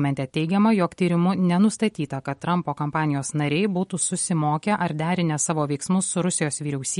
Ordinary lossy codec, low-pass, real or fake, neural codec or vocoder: MP3, 48 kbps; 19.8 kHz; real; none